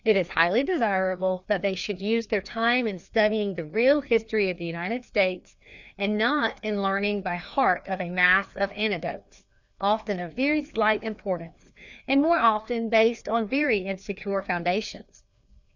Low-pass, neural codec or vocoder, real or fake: 7.2 kHz; codec, 16 kHz, 2 kbps, FreqCodec, larger model; fake